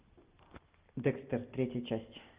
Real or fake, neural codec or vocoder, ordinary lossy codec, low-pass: real; none; Opus, 32 kbps; 3.6 kHz